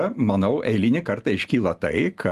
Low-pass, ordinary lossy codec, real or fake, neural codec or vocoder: 14.4 kHz; Opus, 32 kbps; real; none